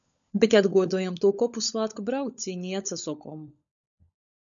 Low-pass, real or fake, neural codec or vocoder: 7.2 kHz; fake; codec, 16 kHz, 16 kbps, FunCodec, trained on LibriTTS, 50 frames a second